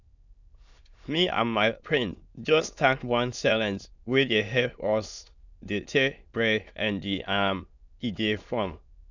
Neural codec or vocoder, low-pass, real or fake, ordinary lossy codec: autoencoder, 22.05 kHz, a latent of 192 numbers a frame, VITS, trained on many speakers; 7.2 kHz; fake; none